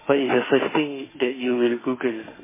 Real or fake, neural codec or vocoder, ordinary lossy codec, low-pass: fake; autoencoder, 48 kHz, 32 numbers a frame, DAC-VAE, trained on Japanese speech; MP3, 16 kbps; 3.6 kHz